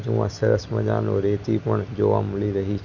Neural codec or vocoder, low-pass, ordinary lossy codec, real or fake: none; 7.2 kHz; none; real